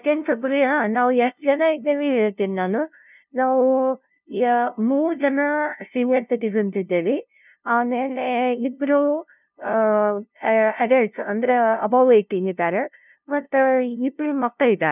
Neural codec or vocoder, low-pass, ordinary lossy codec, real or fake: codec, 16 kHz, 0.5 kbps, FunCodec, trained on LibriTTS, 25 frames a second; 3.6 kHz; none; fake